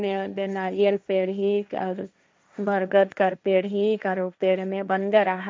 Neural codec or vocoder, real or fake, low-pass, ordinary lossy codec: codec, 16 kHz, 1.1 kbps, Voila-Tokenizer; fake; none; none